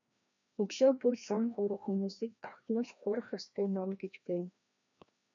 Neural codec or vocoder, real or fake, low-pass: codec, 16 kHz, 1 kbps, FreqCodec, larger model; fake; 7.2 kHz